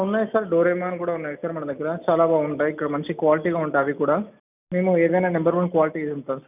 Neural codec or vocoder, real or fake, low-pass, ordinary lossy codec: none; real; 3.6 kHz; none